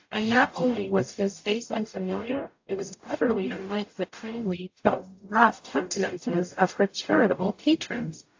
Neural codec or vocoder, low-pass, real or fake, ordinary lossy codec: codec, 44.1 kHz, 0.9 kbps, DAC; 7.2 kHz; fake; AAC, 48 kbps